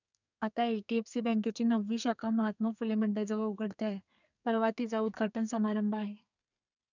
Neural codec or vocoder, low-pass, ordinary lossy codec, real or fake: codec, 32 kHz, 1.9 kbps, SNAC; 7.2 kHz; none; fake